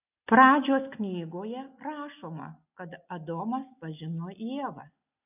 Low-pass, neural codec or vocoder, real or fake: 3.6 kHz; none; real